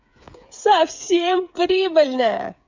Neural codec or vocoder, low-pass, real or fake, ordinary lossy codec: codec, 16 kHz, 8 kbps, FreqCodec, smaller model; 7.2 kHz; fake; MP3, 64 kbps